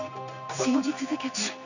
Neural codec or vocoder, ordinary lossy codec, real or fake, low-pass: codec, 16 kHz in and 24 kHz out, 1 kbps, XY-Tokenizer; none; fake; 7.2 kHz